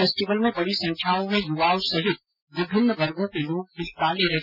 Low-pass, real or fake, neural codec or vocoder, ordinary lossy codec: 5.4 kHz; real; none; none